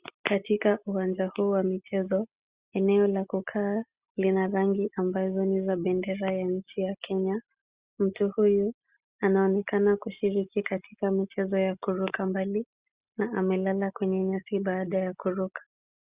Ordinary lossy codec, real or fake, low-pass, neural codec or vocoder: Opus, 64 kbps; real; 3.6 kHz; none